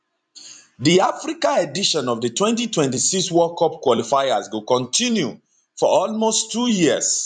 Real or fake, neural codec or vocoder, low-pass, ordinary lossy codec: fake; vocoder, 44.1 kHz, 128 mel bands every 256 samples, BigVGAN v2; 9.9 kHz; none